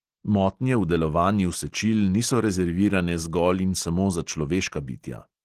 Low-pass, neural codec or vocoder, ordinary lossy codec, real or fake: 14.4 kHz; none; Opus, 16 kbps; real